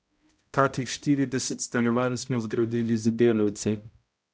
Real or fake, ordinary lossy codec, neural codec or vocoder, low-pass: fake; none; codec, 16 kHz, 0.5 kbps, X-Codec, HuBERT features, trained on balanced general audio; none